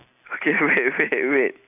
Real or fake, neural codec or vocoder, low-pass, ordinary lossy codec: real; none; 3.6 kHz; none